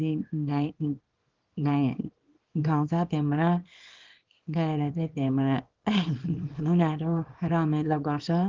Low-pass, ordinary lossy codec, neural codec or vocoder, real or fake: 7.2 kHz; Opus, 16 kbps; codec, 24 kHz, 0.9 kbps, WavTokenizer, small release; fake